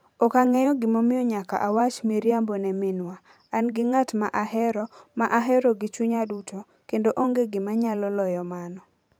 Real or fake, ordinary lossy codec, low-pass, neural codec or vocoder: fake; none; none; vocoder, 44.1 kHz, 128 mel bands every 512 samples, BigVGAN v2